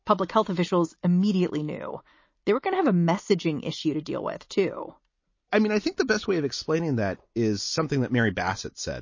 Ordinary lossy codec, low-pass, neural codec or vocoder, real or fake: MP3, 32 kbps; 7.2 kHz; none; real